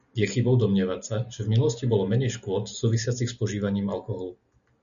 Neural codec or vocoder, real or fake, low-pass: none; real; 7.2 kHz